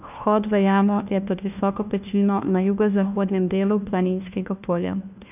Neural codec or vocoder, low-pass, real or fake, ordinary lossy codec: codec, 16 kHz, 1 kbps, FunCodec, trained on LibriTTS, 50 frames a second; 3.6 kHz; fake; none